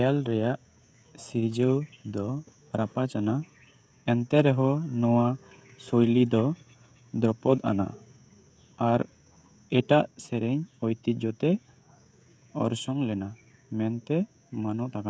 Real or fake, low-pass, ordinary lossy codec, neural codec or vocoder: fake; none; none; codec, 16 kHz, 16 kbps, FreqCodec, smaller model